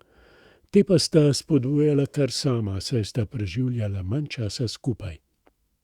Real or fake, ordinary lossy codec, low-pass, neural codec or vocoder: fake; Opus, 64 kbps; 19.8 kHz; autoencoder, 48 kHz, 128 numbers a frame, DAC-VAE, trained on Japanese speech